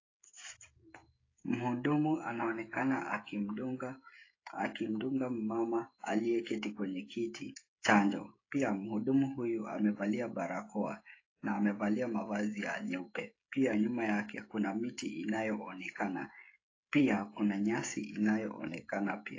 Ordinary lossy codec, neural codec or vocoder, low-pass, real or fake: AAC, 32 kbps; codec, 16 kHz, 16 kbps, FreqCodec, smaller model; 7.2 kHz; fake